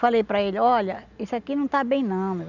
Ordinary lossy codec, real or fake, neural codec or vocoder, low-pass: none; real; none; 7.2 kHz